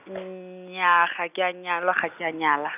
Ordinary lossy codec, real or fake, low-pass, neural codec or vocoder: none; real; 3.6 kHz; none